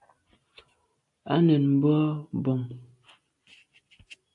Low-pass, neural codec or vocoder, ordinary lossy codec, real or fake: 10.8 kHz; none; AAC, 64 kbps; real